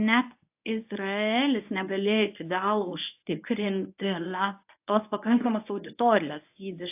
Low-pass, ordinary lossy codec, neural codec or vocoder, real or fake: 3.6 kHz; AAC, 32 kbps; codec, 24 kHz, 0.9 kbps, WavTokenizer, medium speech release version 1; fake